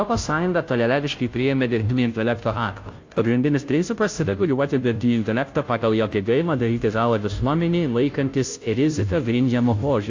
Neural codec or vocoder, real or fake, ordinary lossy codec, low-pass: codec, 16 kHz, 0.5 kbps, FunCodec, trained on Chinese and English, 25 frames a second; fake; AAC, 48 kbps; 7.2 kHz